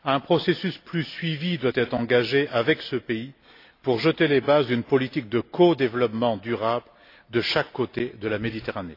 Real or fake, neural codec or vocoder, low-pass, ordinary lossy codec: real; none; 5.4 kHz; AAC, 32 kbps